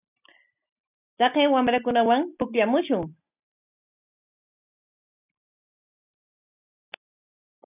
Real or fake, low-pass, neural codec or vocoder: real; 3.6 kHz; none